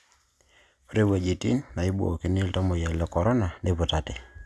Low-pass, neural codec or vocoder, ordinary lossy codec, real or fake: none; none; none; real